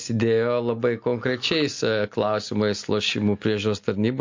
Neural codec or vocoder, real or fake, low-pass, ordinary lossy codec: none; real; 7.2 kHz; MP3, 48 kbps